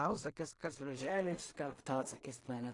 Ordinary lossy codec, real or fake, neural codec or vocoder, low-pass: AAC, 48 kbps; fake; codec, 16 kHz in and 24 kHz out, 0.4 kbps, LongCat-Audio-Codec, two codebook decoder; 10.8 kHz